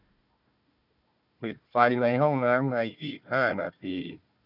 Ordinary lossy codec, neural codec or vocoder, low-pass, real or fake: none; codec, 16 kHz, 1 kbps, FunCodec, trained on Chinese and English, 50 frames a second; 5.4 kHz; fake